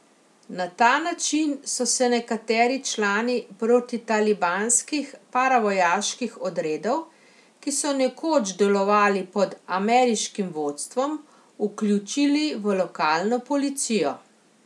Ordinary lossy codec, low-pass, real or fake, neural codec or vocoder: none; none; real; none